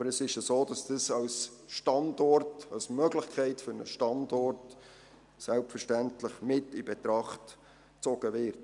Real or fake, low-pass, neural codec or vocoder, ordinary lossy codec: real; 10.8 kHz; none; none